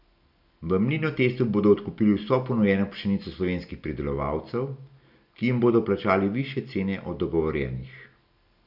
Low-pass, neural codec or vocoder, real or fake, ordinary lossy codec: 5.4 kHz; none; real; none